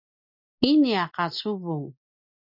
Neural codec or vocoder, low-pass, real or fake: none; 5.4 kHz; real